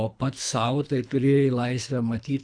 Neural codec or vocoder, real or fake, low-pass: codec, 24 kHz, 3 kbps, HILCodec; fake; 9.9 kHz